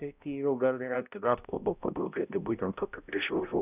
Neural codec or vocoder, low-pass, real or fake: codec, 16 kHz, 0.5 kbps, X-Codec, HuBERT features, trained on balanced general audio; 3.6 kHz; fake